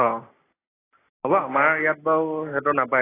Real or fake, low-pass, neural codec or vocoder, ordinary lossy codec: real; 3.6 kHz; none; AAC, 16 kbps